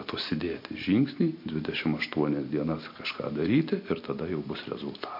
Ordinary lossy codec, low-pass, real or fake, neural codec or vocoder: MP3, 32 kbps; 5.4 kHz; real; none